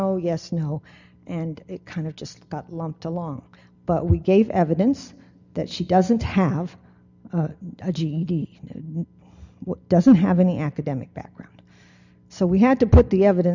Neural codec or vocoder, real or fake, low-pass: none; real; 7.2 kHz